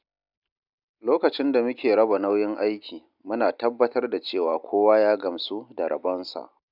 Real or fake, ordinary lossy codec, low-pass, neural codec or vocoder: real; none; 5.4 kHz; none